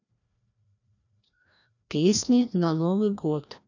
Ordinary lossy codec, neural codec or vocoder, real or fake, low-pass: none; codec, 16 kHz, 1 kbps, FreqCodec, larger model; fake; 7.2 kHz